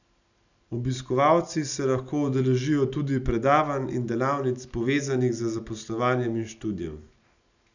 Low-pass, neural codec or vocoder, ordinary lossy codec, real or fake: 7.2 kHz; none; none; real